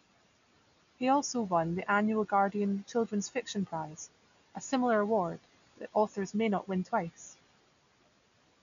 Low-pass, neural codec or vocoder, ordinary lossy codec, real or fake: 7.2 kHz; none; none; real